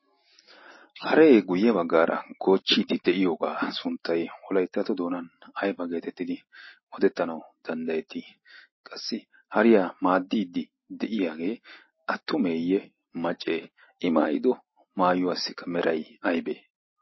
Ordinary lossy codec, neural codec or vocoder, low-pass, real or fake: MP3, 24 kbps; none; 7.2 kHz; real